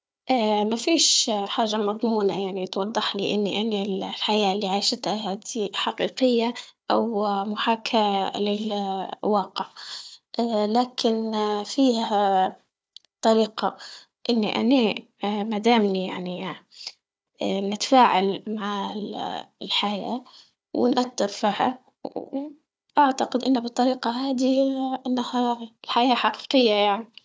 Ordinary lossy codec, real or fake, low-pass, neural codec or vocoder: none; fake; none; codec, 16 kHz, 4 kbps, FunCodec, trained on Chinese and English, 50 frames a second